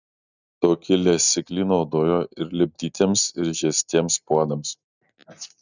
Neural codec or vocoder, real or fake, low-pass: none; real; 7.2 kHz